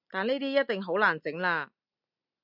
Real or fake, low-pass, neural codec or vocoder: real; 5.4 kHz; none